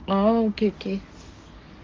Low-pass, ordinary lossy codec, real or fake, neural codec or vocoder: 7.2 kHz; Opus, 32 kbps; fake; codec, 44.1 kHz, 7.8 kbps, DAC